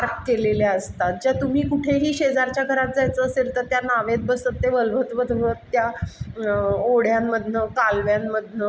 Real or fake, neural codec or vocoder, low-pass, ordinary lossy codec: real; none; none; none